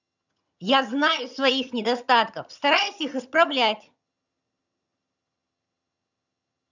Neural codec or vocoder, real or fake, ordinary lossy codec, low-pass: vocoder, 22.05 kHz, 80 mel bands, HiFi-GAN; fake; none; 7.2 kHz